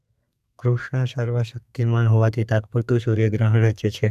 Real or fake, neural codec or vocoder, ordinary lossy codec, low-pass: fake; codec, 32 kHz, 1.9 kbps, SNAC; none; 14.4 kHz